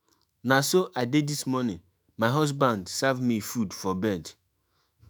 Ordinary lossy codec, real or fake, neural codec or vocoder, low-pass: none; fake; autoencoder, 48 kHz, 128 numbers a frame, DAC-VAE, trained on Japanese speech; none